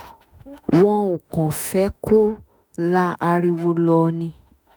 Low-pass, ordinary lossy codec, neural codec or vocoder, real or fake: none; none; autoencoder, 48 kHz, 32 numbers a frame, DAC-VAE, trained on Japanese speech; fake